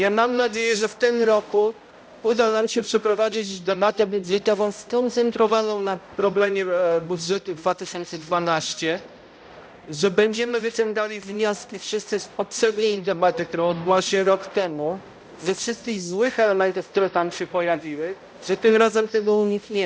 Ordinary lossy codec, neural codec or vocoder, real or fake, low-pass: none; codec, 16 kHz, 0.5 kbps, X-Codec, HuBERT features, trained on balanced general audio; fake; none